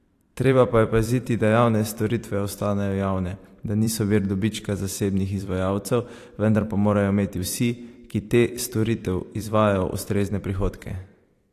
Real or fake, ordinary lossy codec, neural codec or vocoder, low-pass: fake; AAC, 64 kbps; vocoder, 44.1 kHz, 128 mel bands every 512 samples, BigVGAN v2; 14.4 kHz